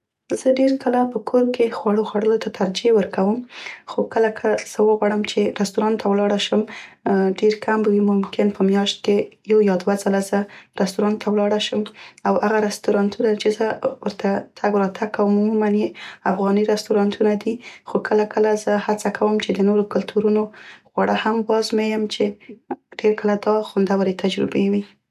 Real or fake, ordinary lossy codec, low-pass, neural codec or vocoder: real; none; 14.4 kHz; none